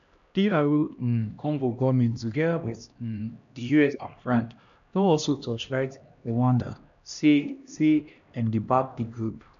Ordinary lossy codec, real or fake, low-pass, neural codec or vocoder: none; fake; 7.2 kHz; codec, 16 kHz, 1 kbps, X-Codec, HuBERT features, trained on LibriSpeech